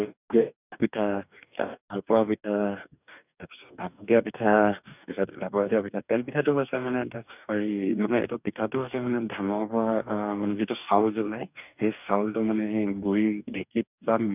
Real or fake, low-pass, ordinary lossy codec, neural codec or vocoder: fake; 3.6 kHz; none; codec, 44.1 kHz, 2.6 kbps, DAC